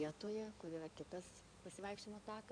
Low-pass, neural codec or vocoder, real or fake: 9.9 kHz; codec, 16 kHz in and 24 kHz out, 2.2 kbps, FireRedTTS-2 codec; fake